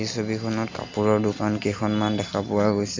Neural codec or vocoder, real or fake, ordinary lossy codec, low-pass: none; real; MP3, 64 kbps; 7.2 kHz